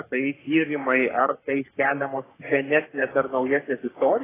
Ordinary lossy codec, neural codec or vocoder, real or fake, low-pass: AAC, 16 kbps; codec, 44.1 kHz, 3.4 kbps, Pupu-Codec; fake; 3.6 kHz